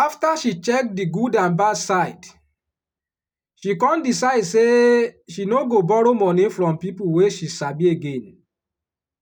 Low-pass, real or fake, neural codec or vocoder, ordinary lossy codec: 19.8 kHz; real; none; none